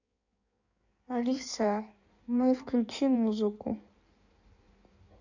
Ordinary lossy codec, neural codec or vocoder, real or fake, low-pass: none; codec, 16 kHz in and 24 kHz out, 1.1 kbps, FireRedTTS-2 codec; fake; 7.2 kHz